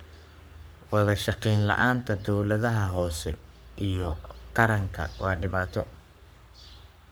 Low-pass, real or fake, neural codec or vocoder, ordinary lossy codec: none; fake; codec, 44.1 kHz, 3.4 kbps, Pupu-Codec; none